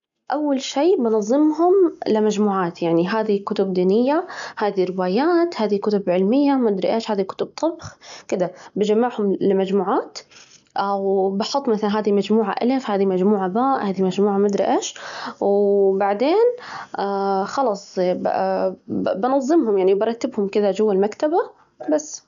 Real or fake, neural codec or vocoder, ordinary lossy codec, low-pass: real; none; none; 7.2 kHz